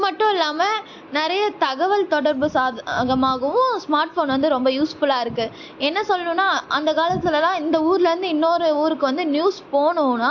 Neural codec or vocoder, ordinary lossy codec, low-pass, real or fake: none; AAC, 48 kbps; 7.2 kHz; real